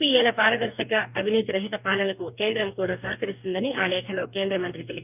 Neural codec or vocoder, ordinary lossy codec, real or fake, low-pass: codec, 44.1 kHz, 2.6 kbps, DAC; none; fake; 3.6 kHz